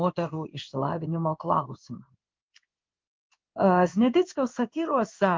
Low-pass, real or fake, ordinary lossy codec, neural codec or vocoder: 7.2 kHz; fake; Opus, 24 kbps; codec, 16 kHz in and 24 kHz out, 1 kbps, XY-Tokenizer